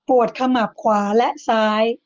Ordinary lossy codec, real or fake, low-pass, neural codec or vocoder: Opus, 24 kbps; real; 7.2 kHz; none